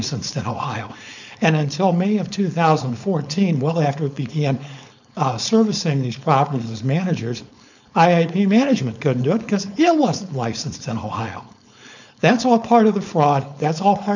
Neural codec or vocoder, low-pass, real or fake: codec, 16 kHz, 4.8 kbps, FACodec; 7.2 kHz; fake